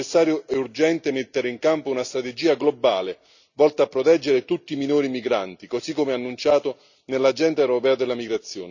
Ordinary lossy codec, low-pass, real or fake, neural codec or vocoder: none; 7.2 kHz; real; none